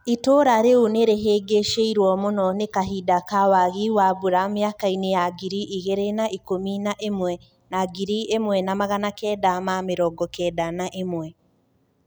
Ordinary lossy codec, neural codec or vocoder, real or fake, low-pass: none; none; real; none